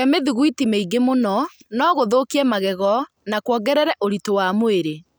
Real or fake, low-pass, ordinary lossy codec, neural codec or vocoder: real; none; none; none